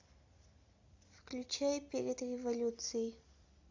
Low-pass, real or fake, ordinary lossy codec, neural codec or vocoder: 7.2 kHz; real; AAC, 48 kbps; none